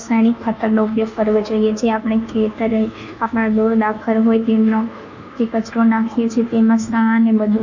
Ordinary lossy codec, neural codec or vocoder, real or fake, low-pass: none; codec, 24 kHz, 1.2 kbps, DualCodec; fake; 7.2 kHz